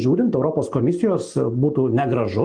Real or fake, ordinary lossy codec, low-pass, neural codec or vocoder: real; Opus, 32 kbps; 9.9 kHz; none